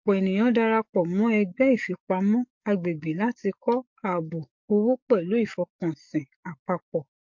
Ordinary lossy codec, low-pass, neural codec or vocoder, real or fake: MP3, 48 kbps; 7.2 kHz; codec, 44.1 kHz, 7.8 kbps, DAC; fake